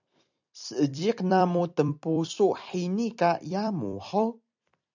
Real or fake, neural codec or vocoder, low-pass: fake; vocoder, 44.1 kHz, 128 mel bands every 256 samples, BigVGAN v2; 7.2 kHz